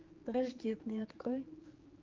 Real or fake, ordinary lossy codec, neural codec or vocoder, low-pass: fake; Opus, 32 kbps; codec, 16 kHz, 4 kbps, X-Codec, HuBERT features, trained on general audio; 7.2 kHz